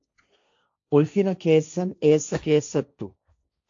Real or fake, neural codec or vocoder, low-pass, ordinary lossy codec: fake; codec, 16 kHz, 1.1 kbps, Voila-Tokenizer; 7.2 kHz; AAC, 64 kbps